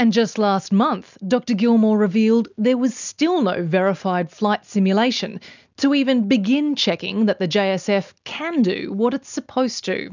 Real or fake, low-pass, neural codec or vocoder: real; 7.2 kHz; none